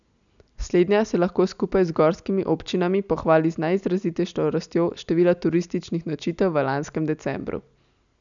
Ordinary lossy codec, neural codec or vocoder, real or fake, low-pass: none; none; real; 7.2 kHz